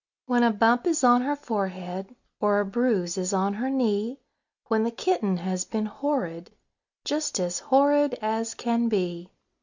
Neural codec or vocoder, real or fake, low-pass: none; real; 7.2 kHz